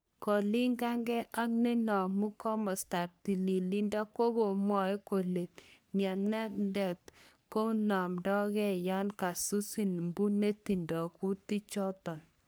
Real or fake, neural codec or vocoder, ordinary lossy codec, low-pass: fake; codec, 44.1 kHz, 3.4 kbps, Pupu-Codec; none; none